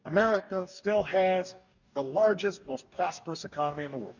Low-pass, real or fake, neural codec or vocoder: 7.2 kHz; fake; codec, 44.1 kHz, 2.6 kbps, DAC